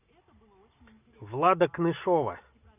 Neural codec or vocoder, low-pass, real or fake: none; 3.6 kHz; real